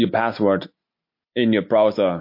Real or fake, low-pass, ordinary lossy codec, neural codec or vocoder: real; 5.4 kHz; MP3, 32 kbps; none